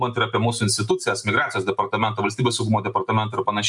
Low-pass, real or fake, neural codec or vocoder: 10.8 kHz; real; none